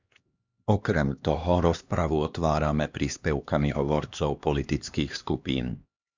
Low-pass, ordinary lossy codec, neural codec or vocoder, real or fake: 7.2 kHz; Opus, 64 kbps; codec, 16 kHz, 2 kbps, X-Codec, HuBERT features, trained on LibriSpeech; fake